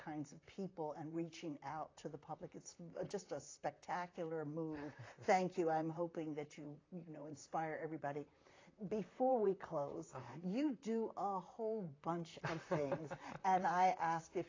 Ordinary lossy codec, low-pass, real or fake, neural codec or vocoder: AAC, 32 kbps; 7.2 kHz; fake; vocoder, 44.1 kHz, 128 mel bands, Pupu-Vocoder